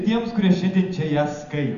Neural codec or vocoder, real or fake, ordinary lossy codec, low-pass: none; real; AAC, 96 kbps; 7.2 kHz